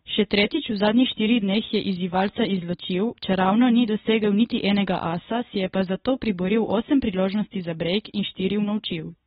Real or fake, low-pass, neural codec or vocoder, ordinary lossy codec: real; 19.8 kHz; none; AAC, 16 kbps